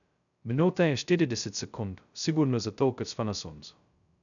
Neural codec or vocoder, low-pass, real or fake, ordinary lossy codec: codec, 16 kHz, 0.2 kbps, FocalCodec; 7.2 kHz; fake; none